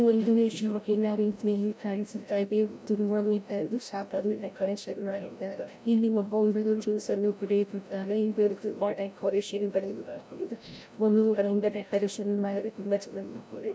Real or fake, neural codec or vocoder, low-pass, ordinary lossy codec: fake; codec, 16 kHz, 0.5 kbps, FreqCodec, larger model; none; none